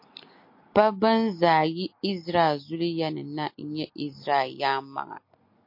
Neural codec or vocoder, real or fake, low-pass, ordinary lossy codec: none; real; 5.4 kHz; MP3, 32 kbps